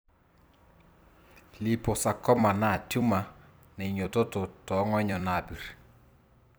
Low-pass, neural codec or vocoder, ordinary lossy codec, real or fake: none; vocoder, 44.1 kHz, 128 mel bands every 256 samples, BigVGAN v2; none; fake